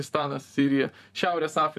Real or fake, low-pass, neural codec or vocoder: fake; 14.4 kHz; vocoder, 44.1 kHz, 128 mel bands, Pupu-Vocoder